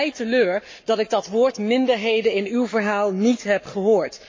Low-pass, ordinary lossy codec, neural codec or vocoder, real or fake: 7.2 kHz; MP3, 32 kbps; codec, 44.1 kHz, 7.8 kbps, Pupu-Codec; fake